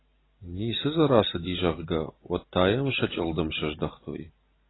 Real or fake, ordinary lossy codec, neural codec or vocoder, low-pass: real; AAC, 16 kbps; none; 7.2 kHz